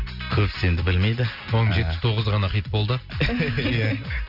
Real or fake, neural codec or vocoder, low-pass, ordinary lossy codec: real; none; 5.4 kHz; none